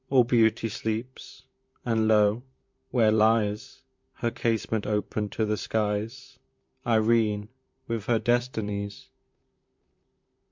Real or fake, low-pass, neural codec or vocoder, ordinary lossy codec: fake; 7.2 kHz; vocoder, 44.1 kHz, 128 mel bands, Pupu-Vocoder; MP3, 64 kbps